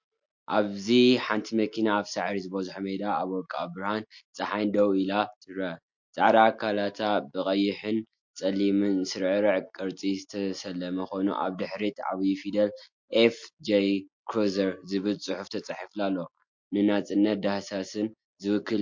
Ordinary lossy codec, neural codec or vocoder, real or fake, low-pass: MP3, 48 kbps; none; real; 7.2 kHz